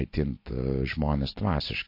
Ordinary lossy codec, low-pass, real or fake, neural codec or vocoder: MP3, 32 kbps; 5.4 kHz; real; none